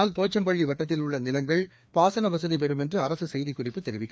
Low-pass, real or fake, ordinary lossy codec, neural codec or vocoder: none; fake; none; codec, 16 kHz, 2 kbps, FreqCodec, larger model